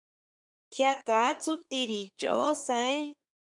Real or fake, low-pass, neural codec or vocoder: fake; 10.8 kHz; codec, 24 kHz, 1 kbps, SNAC